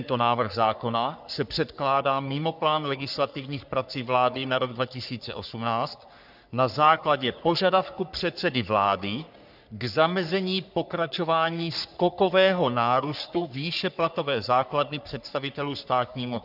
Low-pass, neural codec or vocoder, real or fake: 5.4 kHz; codec, 44.1 kHz, 3.4 kbps, Pupu-Codec; fake